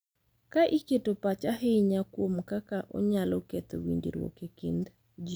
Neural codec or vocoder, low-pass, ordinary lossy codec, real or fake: none; none; none; real